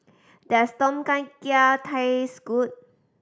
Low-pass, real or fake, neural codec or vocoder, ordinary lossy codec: none; real; none; none